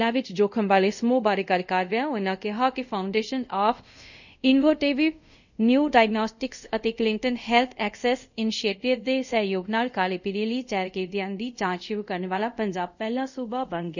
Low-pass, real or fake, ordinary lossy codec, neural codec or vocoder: 7.2 kHz; fake; none; codec, 24 kHz, 0.5 kbps, DualCodec